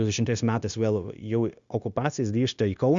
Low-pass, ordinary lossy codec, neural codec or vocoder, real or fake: 7.2 kHz; Opus, 64 kbps; codec, 16 kHz, 0.9 kbps, LongCat-Audio-Codec; fake